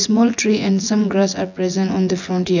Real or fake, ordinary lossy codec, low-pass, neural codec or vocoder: fake; none; 7.2 kHz; vocoder, 24 kHz, 100 mel bands, Vocos